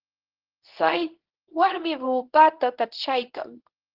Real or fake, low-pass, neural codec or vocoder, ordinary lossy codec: fake; 5.4 kHz; codec, 24 kHz, 0.9 kbps, WavTokenizer, medium speech release version 1; Opus, 16 kbps